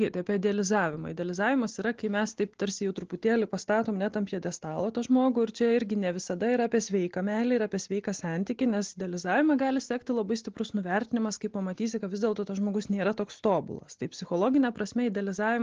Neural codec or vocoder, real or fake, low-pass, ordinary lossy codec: none; real; 7.2 kHz; Opus, 16 kbps